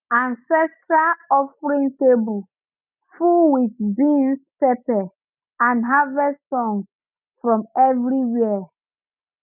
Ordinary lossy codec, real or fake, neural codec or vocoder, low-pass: none; real; none; 3.6 kHz